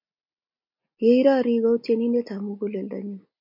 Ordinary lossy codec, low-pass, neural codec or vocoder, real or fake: MP3, 32 kbps; 5.4 kHz; none; real